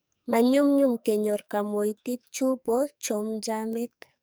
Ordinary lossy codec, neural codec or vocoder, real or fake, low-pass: none; codec, 44.1 kHz, 2.6 kbps, SNAC; fake; none